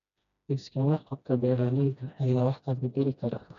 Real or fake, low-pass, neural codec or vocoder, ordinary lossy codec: fake; 7.2 kHz; codec, 16 kHz, 1 kbps, FreqCodec, smaller model; none